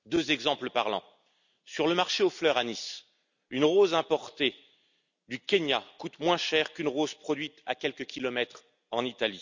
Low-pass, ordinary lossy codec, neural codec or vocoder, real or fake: 7.2 kHz; none; none; real